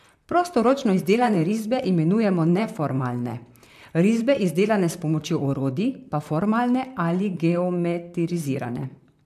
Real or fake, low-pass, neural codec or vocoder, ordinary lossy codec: fake; 14.4 kHz; vocoder, 44.1 kHz, 128 mel bands, Pupu-Vocoder; MP3, 96 kbps